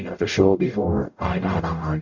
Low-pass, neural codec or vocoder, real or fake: 7.2 kHz; codec, 44.1 kHz, 0.9 kbps, DAC; fake